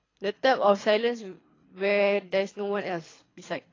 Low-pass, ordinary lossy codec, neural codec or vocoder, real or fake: 7.2 kHz; AAC, 32 kbps; codec, 24 kHz, 3 kbps, HILCodec; fake